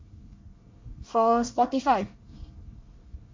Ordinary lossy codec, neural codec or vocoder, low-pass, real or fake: MP3, 48 kbps; codec, 24 kHz, 1 kbps, SNAC; 7.2 kHz; fake